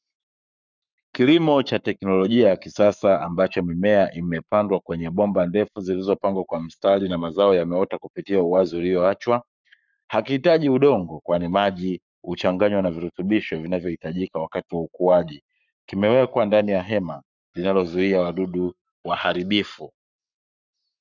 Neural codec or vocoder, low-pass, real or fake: codec, 44.1 kHz, 7.8 kbps, Pupu-Codec; 7.2 kHz; fake